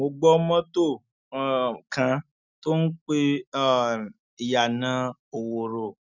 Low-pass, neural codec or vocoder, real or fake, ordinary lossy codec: 7.2 kHz; none; real; none